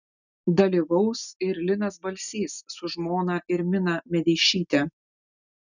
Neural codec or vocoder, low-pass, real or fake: none; 7.2 kHz; real